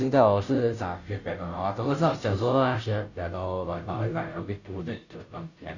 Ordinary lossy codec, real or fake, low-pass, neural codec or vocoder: none; fake; 7.2 kHz; codec, 16 kHz, 0.5 kbps, FunCodec, trained on Chinese and English, 25 frames a second